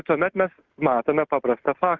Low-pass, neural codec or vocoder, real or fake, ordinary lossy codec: 7.2 kHz; none; real; Opus, 24 kbps